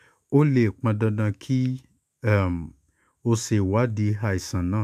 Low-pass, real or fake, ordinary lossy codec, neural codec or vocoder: 14.4 kHz; fake; MP3, 96 kbps; autoencoder, 48 kHz, 128 numbers a frame, DAC-VAE, trained on Japanese speech